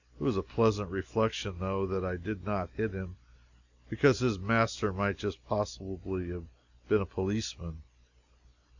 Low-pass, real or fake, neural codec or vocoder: 7.2 kHz; real; none